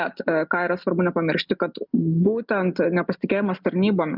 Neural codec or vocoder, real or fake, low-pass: none; real; 5.4 kHz